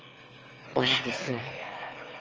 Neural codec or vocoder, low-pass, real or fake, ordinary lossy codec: autoencoder, 22.05 kHz, a latent of 192 numbers a frame, VITS, trained on one speaker; 7.2 kHz; fake; Opus, 24 kbps